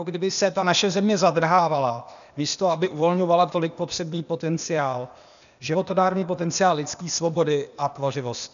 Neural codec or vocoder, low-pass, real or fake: codec, 16 kHz, 0.8 kbps, ZipCodec; 7.2 kHz; fake